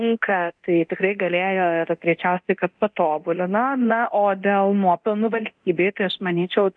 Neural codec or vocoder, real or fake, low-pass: codec, 24 kHz, 0.9 kbps, DualCodec; fake; 9.9 kHz